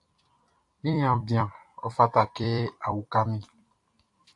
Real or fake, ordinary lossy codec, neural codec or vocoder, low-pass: fake; AAC, 64 kbps; vocoder, 44.1 kHz, 128 mel bands every 256 samples, BigVGAN v2; 10.8 kHz